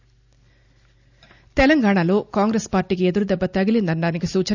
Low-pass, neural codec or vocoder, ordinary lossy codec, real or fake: 7.2 kHz; none; none; real